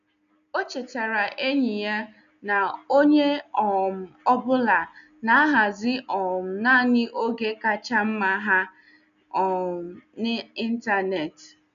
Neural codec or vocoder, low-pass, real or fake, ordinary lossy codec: none; 7.2 kHz; real; none